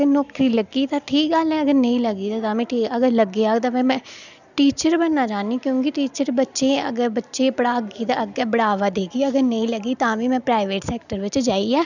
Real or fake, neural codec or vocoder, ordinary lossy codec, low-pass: real; none; none; 7.2 kHz